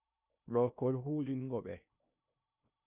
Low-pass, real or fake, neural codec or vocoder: 3.6 kHz; fake; codec, 16 kHz in and 24 kHz out, 0.8 kbps, FocalCodec, streaming, 65536 codes